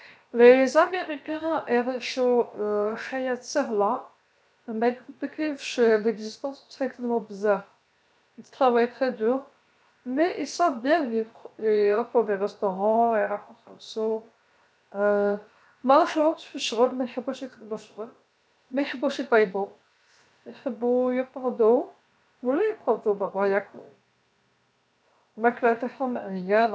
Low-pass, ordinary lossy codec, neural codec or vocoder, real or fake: none; none; codec, 16 kHz, 0.7 kbps, FocalCodec; fake